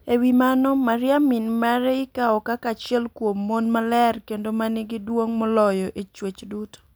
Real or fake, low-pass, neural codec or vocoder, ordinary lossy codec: real; none; none; none